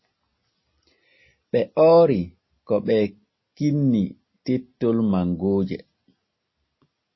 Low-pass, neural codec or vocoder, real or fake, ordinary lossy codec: 7.2 kHz; none; real; MP3, 24 kbps